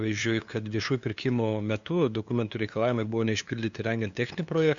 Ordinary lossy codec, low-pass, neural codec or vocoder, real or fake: Opus, 64 kbps; 7.2 kHz; codec, 16 kHz, 2 kbps, FunCodec, trained on LibriTTS, 25 frames a second; fake